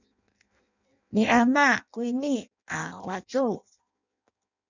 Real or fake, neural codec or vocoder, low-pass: fake; codec, 16 kHz in and 24 kHz out, 0.6 kbps, FireRedTTS-2 codec; 7.2 kHz